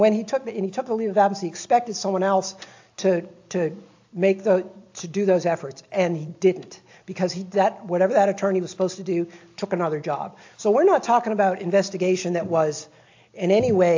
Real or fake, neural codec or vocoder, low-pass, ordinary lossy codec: real; none; 7.2 kHz; AAC, 48 kbps